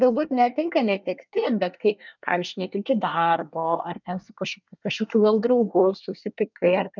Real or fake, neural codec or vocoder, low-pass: fake; codec, 24 kHz, 1 kbps, SNAC; 7.2 kHz